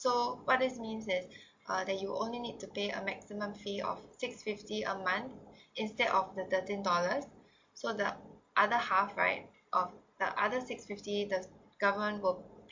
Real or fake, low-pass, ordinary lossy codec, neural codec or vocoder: real; 7.2 kHz; MP3, 48 kbps; none